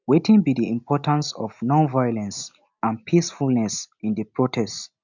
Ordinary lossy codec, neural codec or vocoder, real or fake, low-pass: none; none; real; 7.2 kHz